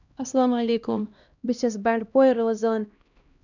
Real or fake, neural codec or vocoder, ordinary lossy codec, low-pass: fake; codec, 16 kHz, 1 kbps, X-Codec, HuBERT features, trained on LibriSpeech; none; 7.2 kHz